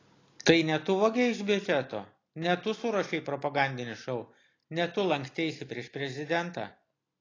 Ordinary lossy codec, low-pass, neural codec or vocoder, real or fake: AAC, 32 kbps; 7.2 kHz; none; real